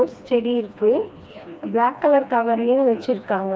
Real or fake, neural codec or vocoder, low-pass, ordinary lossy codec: fake; codec, 16 kHz, 2 kbps, FreqCodec, smaller model; none; none